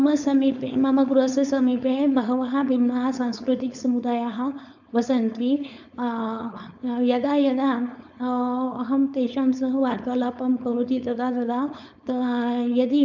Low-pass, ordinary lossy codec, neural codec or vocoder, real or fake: 7.2 kHz; none; codec, 16 kHz, 4.8 kbps, FACodec; fake